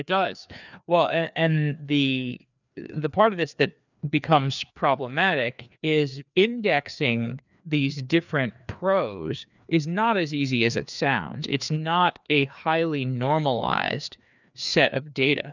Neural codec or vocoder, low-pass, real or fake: codec, 16 kHz, 2 kbps, FreqCodec, larger model; 7.2 kHz; fake